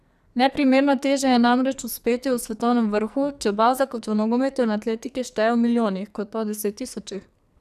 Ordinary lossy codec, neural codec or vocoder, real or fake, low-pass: none; codec, 44.1 kHz, 2.6 kbps, SNAC; fake; 14.4 kHz